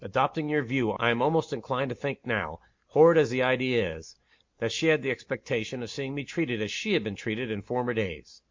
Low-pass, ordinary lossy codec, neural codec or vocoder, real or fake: 7.2 kHz; MP3, 48 kbps; none; real